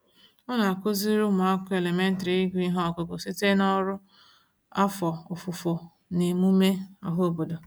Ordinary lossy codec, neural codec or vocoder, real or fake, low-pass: none; none; real; none